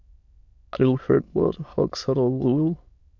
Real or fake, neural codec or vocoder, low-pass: fake; autoencoder, 22.05 kHz, a latent of 192 numbers a frame, VITS, trained on many speakers; 7.2 kHz